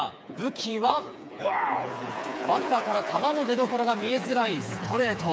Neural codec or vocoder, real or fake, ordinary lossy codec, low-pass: codec, 16 kHz, 4 kbps, FreqCodec, smaller model; fake; none; none